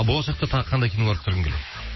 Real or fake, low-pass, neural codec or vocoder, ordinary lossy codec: fake; 7.2 kHz; autoencoder, 48 kHz, 128 numbers a frame, DAC-VAE, trained on Japanese speech; MP3, 24 kbps